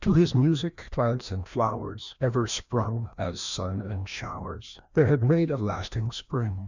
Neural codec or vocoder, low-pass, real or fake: codec, 16 kHz, 1 kbps, FreqCodec, larger model; 7.2 kHz; fake